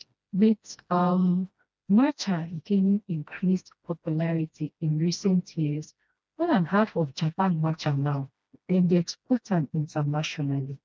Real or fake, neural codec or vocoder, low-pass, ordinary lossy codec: fake; codec, 16 kHz, 1 kbps, FreqCodec, smaller model; none; none